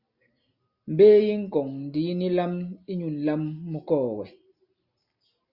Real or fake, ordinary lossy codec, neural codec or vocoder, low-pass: real; Opus, 64 kbps; none; 5.4 kHz